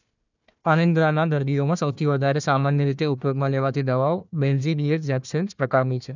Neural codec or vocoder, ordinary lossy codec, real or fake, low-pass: codec, 16 kHz, 1 kbps, FunCodec, trained on Chinese and English, 50 frames a second; none; fake; 7.2 kHz